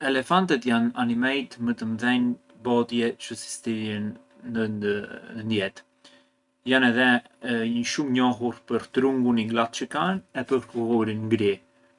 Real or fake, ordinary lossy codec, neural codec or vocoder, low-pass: real; none; none; 10.8 kHz